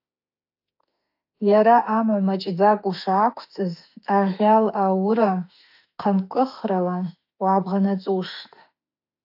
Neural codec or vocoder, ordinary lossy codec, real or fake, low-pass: autoencoder, 48 kHz, 32 numbers a frame, DAC-VAE, trained on Japanese speech; AAC, 48 kbps; fake; 5.4 kHz